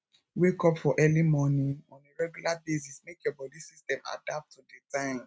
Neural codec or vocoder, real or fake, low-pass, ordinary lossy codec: none; real; none; none